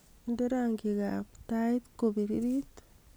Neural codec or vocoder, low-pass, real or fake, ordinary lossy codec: none; none; real; none